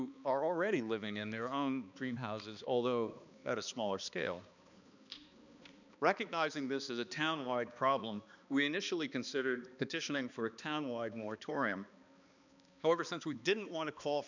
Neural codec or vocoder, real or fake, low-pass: codec, 16 kHz, 2 kbps, X-Codec, HuBERT features, trained on balanced general audio; fake; 7.2 kHz